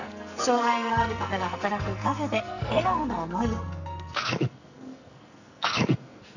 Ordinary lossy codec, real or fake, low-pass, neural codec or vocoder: none; fake; 7.2 kHz; codec, 44.1 kHz, 2.6 kbps, SNAC